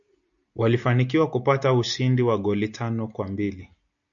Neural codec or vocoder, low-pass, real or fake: none; 7.2 kHz; real